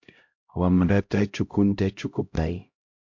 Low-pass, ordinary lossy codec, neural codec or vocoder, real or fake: 7.2 kHz; MP3, 48 kbps; codec, 16 kHz, 0.5 kbps, X-Codec, HuBERT features, trained on LibriSpeech; fake